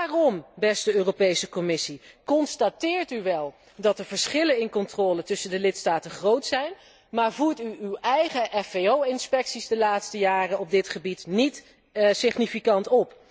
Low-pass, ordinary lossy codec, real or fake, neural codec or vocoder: none; none; real; none